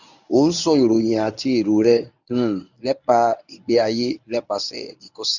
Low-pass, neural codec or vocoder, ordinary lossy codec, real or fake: 7.2 kHz; codec, 24 kHz, 0.9 kbps, WavTokenizer, medium speech release version 1; none; fake